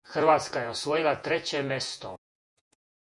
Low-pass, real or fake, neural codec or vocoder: 10.8 kHz; fake; vocoder, 48 kHz, 128 mel bands, Vocos